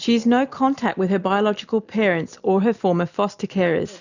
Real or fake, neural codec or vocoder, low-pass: real; none; 7.2 kHz